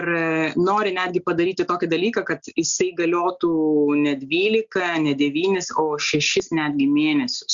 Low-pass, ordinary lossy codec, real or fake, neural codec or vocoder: 7.2 kHz; Opus, 64 kbps; real; none